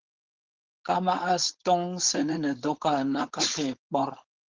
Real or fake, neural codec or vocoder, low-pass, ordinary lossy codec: fake; codec, 16 kHz, 4.8 kbps, FACodec; 7.2 kHz; Opus, 16 kbps